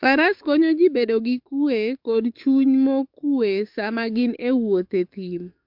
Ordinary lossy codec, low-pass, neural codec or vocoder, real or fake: MP3, 48 kbps; 5.4 kHz; codec, 44.1 kHz, 7.8 kbps, DAC; fake